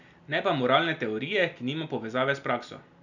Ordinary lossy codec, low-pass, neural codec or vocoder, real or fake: none; 7.2 kHz; none; real